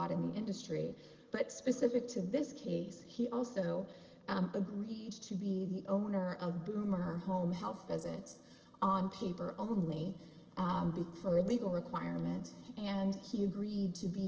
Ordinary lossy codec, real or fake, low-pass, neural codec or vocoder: Opus, 16 kbps; real; 7.2 kHz; none